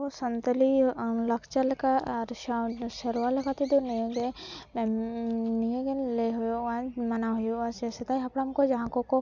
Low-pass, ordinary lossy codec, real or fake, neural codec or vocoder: 7.2 kHz; none; real; none